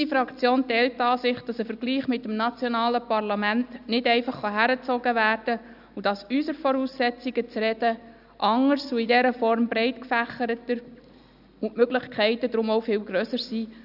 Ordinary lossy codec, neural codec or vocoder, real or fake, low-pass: none; none; real; 5.4 kHz